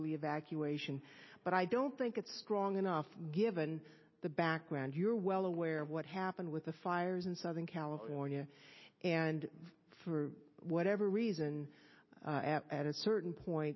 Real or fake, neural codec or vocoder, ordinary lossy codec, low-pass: real; none; MP3, 24 kbps; 7.2 kHz